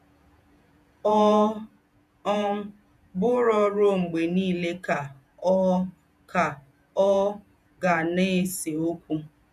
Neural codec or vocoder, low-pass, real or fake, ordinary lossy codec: vocoder, 48 kHz, 128 mel bands, Vocos; 14.4 kHz; fake; none